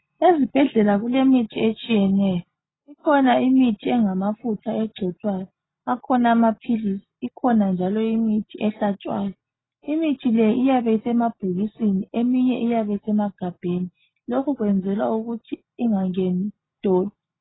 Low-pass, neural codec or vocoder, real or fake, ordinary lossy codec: 7.2 kHz; none; real; AAC, 16 kbps